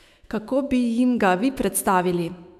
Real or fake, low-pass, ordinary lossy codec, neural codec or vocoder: fake; 14.4 kHz; none; autoencoder, 48 kHz, 128 numbers a frame, DAC-VAE, trained on Japanese speech